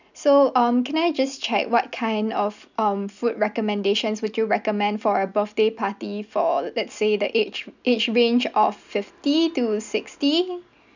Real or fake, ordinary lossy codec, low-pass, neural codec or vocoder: real; none; 7.2 kHz; none